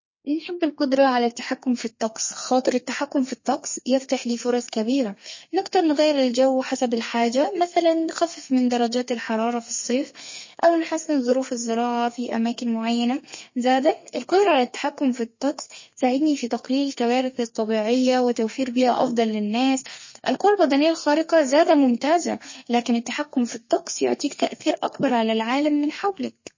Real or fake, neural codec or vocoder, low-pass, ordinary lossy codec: fake; codec, 32 kHz, 1.9 kbps, SNAC; 7.2 kHz; MP3, 32 kbps